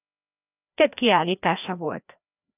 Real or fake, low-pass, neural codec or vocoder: fake; 3.6 kHz; codec, 16 kHz, 1 kbps, FreqCodec, larger model